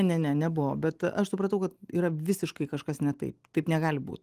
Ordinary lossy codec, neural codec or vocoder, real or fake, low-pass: Opus, 24 kbps; none; real; 14.4 kHz